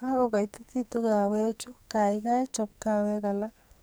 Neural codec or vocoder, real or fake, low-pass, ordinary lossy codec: codec, 44.1 kHz, 2.6 kbps, SNAC; fake; none; none